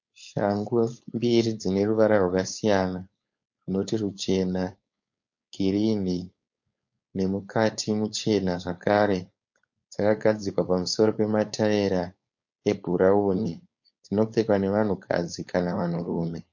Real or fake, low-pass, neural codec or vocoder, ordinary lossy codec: fake; 7.2 kHz; codec, 16 kHz, 4.8 kbps, FACodec; MP3, 48 kbps